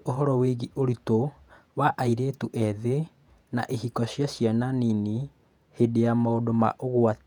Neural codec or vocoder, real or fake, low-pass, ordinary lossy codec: vocoder, 48 kHz, 128 mel bands, Vocos; fake; 19.8 kHz; none